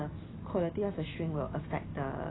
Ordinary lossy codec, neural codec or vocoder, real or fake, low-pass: AAC, 16 kbps; none; real; 7.2 kHz